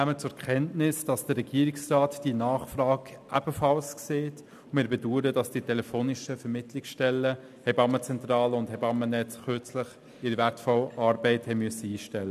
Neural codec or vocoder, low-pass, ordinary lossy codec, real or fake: none; 14.4 kHz; none; real